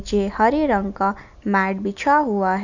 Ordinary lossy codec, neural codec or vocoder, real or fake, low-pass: none; none; real; 7.2 kHz